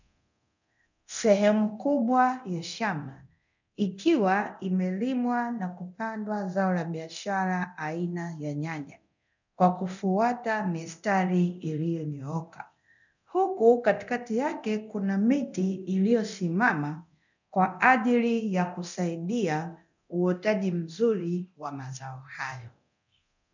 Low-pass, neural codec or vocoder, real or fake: 7.2 kHz; codec, 24 kHz, 0.9 kbps, DualCodec; fake